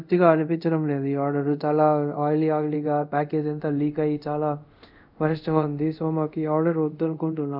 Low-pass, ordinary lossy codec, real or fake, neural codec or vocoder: 5.4 kHz; none; fake; codec, 24 kHz, 0.5 kbps, DualCodec